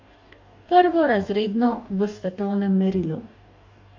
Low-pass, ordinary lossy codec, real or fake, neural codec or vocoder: 7.2 kHz; AAC, 48 kbps; fake; codec, 44.1 kHz, 2.6 kbps, DAC